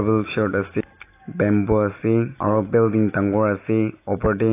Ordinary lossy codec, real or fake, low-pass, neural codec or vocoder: AAC, 24 kbps; real; 3.6 kHz; none